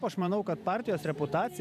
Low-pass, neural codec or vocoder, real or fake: 14.4 kHz; vocoder, 44.1 kHz, 128 mel bands every 256 samples, BigVGAN v2; fake